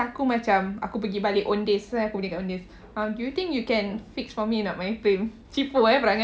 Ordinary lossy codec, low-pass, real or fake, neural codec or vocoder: none; none; real; none